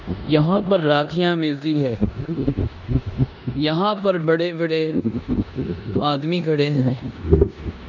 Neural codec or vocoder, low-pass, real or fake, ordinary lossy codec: codec, 16 kHz in and 24 kHz out, 0.9 kbps, LongCat-Audio-Codec, four codebook decoder; 7.2 kHz; fake; none